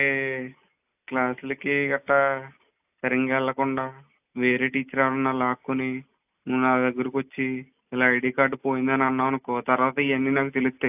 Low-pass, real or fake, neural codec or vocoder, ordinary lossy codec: 3.6 kHz; real; none; AAC, 32 kbps